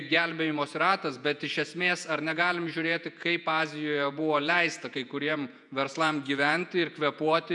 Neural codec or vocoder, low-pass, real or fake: vocoder, 48 kHz, 128 mel bands, Vocos; 10.8 kHz; fake